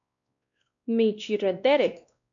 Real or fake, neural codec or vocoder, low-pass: fake; codec, 16 kHz, 1 kbps, X-Codec, WavLM features, trained on Multilingual LibriSpeech; 7.2 kHz